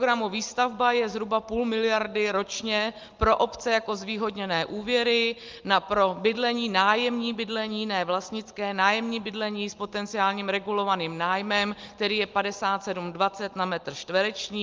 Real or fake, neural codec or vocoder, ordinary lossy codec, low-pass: real; none; Opus, 32 kbps; 7.2 kHz